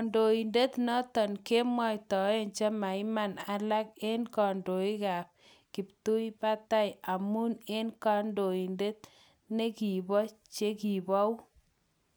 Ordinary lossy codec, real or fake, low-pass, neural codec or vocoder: none; real; none; none